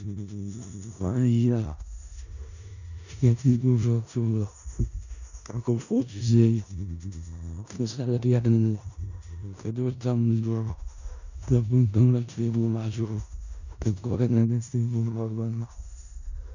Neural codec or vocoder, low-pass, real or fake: codec, 16 kHz in and 24 kHz out, 0.4 kbps, LongCat-Audio-Codec, four codebook decoder; 7.2 kHz; fake